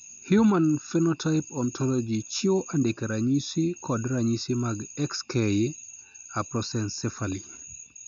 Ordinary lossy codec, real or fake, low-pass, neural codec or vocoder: none; real; 7.2 kHz; none